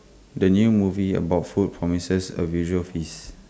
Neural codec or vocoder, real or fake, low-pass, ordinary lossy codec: none; real; none; none